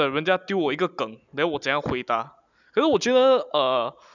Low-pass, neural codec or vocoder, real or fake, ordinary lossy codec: 7.2 kHz; none; real; none